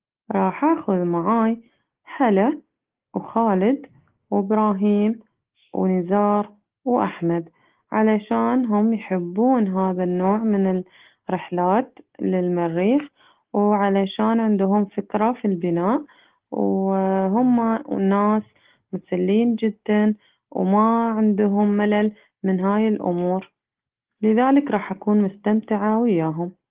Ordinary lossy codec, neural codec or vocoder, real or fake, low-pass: Opus, 16 kbps; none; real; 3.6 kHz